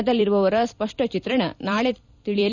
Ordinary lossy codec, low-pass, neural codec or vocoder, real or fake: none; 7.2 kHz; vocoder, 44.1 kHz, 128 mel bands every 256 samples, BigVGAN v2; fake